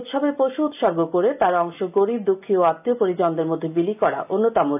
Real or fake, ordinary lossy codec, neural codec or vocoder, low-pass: real; none; none; 3.6 kHz